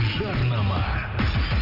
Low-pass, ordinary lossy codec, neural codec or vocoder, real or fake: 5.4 kHz; none; none; real